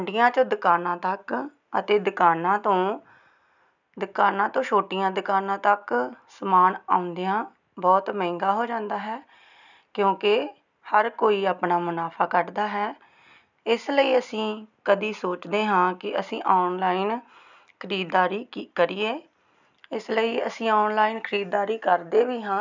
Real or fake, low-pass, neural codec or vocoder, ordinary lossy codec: real; 7.2 kHz; none; none